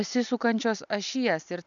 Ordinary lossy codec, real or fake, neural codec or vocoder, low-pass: MP3, 96 kbps; real; none; 7.2 kHz